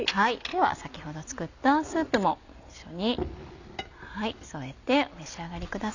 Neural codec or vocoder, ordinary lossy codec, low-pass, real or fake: none; none; 7.2 kHz; real